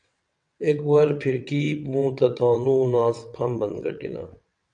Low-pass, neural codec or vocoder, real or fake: 9.9 kHz; vocoder, 22.05 kHz, 80 mel bands, WaveNeXt; fake